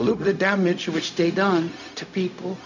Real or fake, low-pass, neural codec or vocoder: fake; 7.2 kHz; codec, 16 kHz, 0.4 kbps, LongCat-Audio-Codec